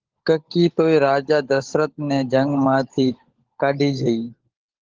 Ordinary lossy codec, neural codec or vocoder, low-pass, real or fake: Opus, 16 kbps; codec, 16 kHz, 16 kbps, FunCodec, trained on LibriTTS, 50 frames a second; 7.2 kHz; fake